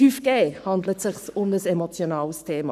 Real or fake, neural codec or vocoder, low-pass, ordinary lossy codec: fake; codec, 44.1 kHz, 7.8 kbps, Pupu-Codec; 14.4 kHz; none